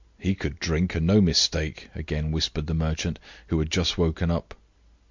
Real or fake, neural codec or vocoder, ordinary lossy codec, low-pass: real; none; MP3, 64 kbps; 7.2 kHz